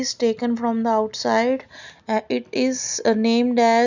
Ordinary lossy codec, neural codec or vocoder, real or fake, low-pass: none; none; real; 7.2 kHz